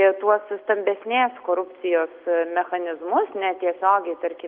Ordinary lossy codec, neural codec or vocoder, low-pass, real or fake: Opus, 24 kbps; none; 5.4 kHz; real